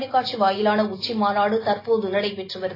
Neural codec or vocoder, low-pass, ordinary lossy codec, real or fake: none; 5.4 kHz; AAC, 24 kbps; real